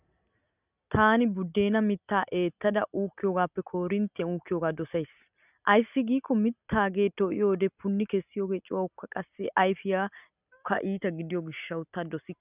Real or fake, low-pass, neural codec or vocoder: real; 3.6 kHz; none